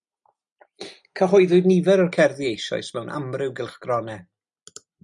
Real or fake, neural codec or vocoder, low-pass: real; none; 10.8 kHz